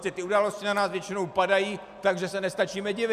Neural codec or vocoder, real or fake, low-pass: none; real; 14.4 kHz